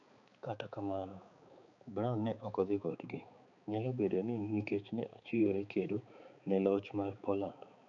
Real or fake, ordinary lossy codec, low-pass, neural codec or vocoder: fake; MP3, 96 kbps; 7.2 kHz; codec, 16 kHz, 4 kbps, X-Codec, HuBERT features, trained on balanced general audio